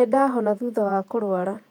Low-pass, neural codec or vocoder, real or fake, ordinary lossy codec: 19.8 kHz; vocoder, 48 kHz, 128 mel bands, Vocos; fake; none